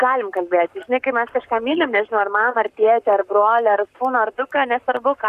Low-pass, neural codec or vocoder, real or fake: 14.4 kHz; codec, 44.1 kHz, 7.8 kbps, Pupu-Codec; fake